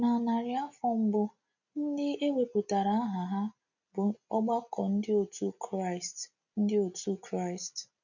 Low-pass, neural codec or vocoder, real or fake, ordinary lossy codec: 7.2 kHz; none; real; none